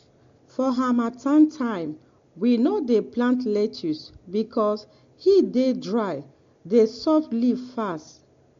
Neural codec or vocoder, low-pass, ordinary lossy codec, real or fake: none; 7.2 kHz; AAC, 48 kbps; real